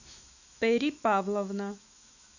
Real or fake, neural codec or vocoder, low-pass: fake; autoencoder, 48 kHz, 128 numbers a frame, DAC-VAE, trained on Japanese speech; 7.2 kHz